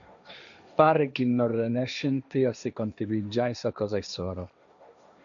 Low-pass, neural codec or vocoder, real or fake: 7.2 kHz; codec, 16 kHz, 1.1 kbps, Voila-Tokenizer; fake